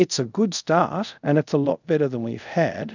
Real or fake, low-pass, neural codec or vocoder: fake; 7.2 kHz; codec, 24 kHz, 0.5 kbps, DualCodec